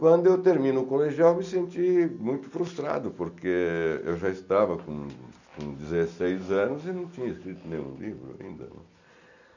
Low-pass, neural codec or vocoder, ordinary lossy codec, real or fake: 7.2 kHz; none; none; real